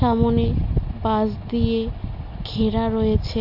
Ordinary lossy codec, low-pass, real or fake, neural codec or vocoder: none; 5.4 kHz; real; none